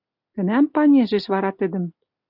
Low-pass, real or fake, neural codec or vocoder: 5.4 kHz; real; none